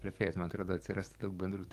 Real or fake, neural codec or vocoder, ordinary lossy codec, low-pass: fake; codec, 44.1 kHz, 7.8 kbps, DAC; Opus, 32 kbps; 14.4 kHz